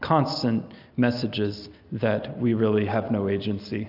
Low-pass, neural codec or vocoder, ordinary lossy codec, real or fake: 5.4 kHz; none; AAC, 48 kbps; real